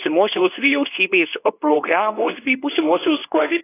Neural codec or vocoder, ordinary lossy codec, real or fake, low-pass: codec, 24 kHz, 0.9 kbps, WavTokenizer, medium speech release version 2; AAC, 16 kbps; fake; 3.6 kHz